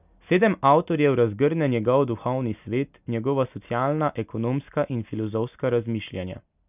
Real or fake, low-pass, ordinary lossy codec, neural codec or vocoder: real; 3.6 kHz; none; none